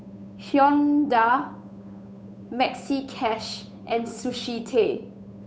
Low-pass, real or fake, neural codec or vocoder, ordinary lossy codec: none; fake; codec, 16 kHz, 8 kbps, FunCodec, trained on Chinese and English, 25 frames a second; none